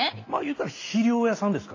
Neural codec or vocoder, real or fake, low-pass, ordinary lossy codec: none; real; 7.2 kHz; AAC, 32 kbps